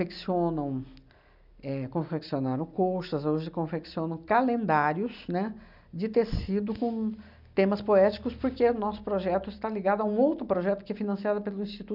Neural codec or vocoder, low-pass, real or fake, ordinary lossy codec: none; 5.4 kHz; real; none